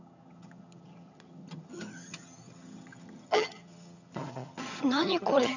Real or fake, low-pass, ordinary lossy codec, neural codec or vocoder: fake; 7.2 kHz; none; vocoder, 22.05 kHz, 80 mel bands, HiFi-GAN